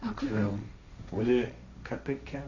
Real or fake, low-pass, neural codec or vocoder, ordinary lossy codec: fake; 7.2 kHz; codec, 16 kHz, 1.1 kbps, Voila-Tokenizer; AAC, 48 kbps